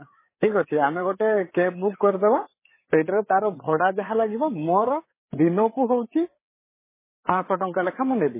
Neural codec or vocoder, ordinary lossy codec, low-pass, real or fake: codec, 16 kHz, 4 kbps, FreqCodec, larger model; MP3, 16 kbps; 3.6 kHz; fake